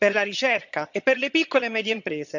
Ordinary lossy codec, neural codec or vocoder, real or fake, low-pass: none; vocoder, 22.05 kHz, 80 mel bands, HiFi-GAN; fake; 7.2 kHz